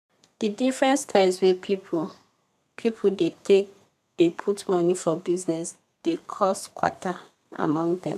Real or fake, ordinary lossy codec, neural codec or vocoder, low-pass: fake; none; codec, 32 kHz, 1.9 kbps, SNAC; 14.4 kHz